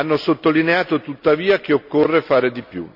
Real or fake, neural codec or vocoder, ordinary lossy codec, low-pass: real; none; none; 5.4 kHz